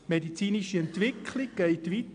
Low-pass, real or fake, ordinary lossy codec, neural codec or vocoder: 9.9 kHz; real; AAC, 64 kbps; none